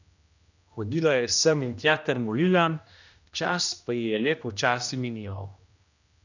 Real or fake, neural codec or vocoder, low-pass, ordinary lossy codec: fake; codec, 16 kHz, 1 kbps, X-Codec, HuBERT features, trained on general audio; 7.2 kHz; none